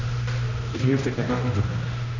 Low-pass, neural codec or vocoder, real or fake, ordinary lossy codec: 7.2 kHz; codec, 16 kHz, 1 kbps, X-Codec, HuBERT features, trained on general audio; fake; none